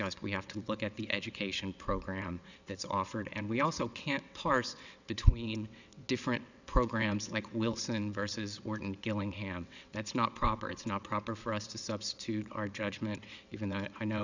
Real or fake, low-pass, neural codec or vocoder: fake; 7.2 kHz; vocoder, 22.05 kHz, 80 mel bands, WaveNeXt